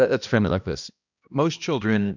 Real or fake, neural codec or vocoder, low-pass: fake; codec, 16 kHz, 1 kbps, X-Codec, HuBERT features, trained on balanced general audio; 7.2 kHz